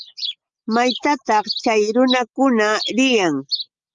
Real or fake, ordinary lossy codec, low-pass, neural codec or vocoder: real; Opus, 32 kbps; 7.2 kHz; none